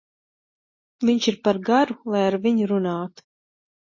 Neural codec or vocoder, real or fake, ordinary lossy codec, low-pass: none; real; MP3, 32 kbps; 7.2 kHz